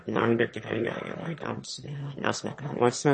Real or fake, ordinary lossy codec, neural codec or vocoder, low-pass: fake; MP3, 32 kbps; autoencoder, 22.05 kHz, a latent of 192 numbers a frame, VITS, trained on one speaker; 9.9 kHz